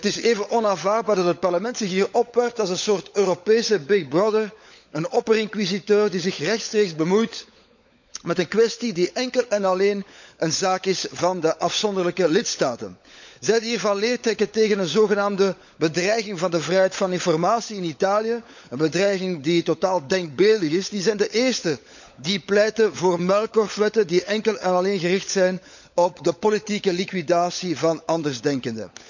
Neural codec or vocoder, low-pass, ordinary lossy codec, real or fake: codec, 16 kHz, 16 kbps, FunCodec, trained on LibriTTS, 50 frames a second; 7.2 kHz; none; fake